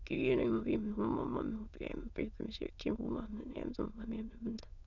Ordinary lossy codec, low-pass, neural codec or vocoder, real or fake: none; 7.2 kHz; autoencoder, 22.05 kHz, a latent of 192 numbers a frame, VITS, trained on many speakers; fake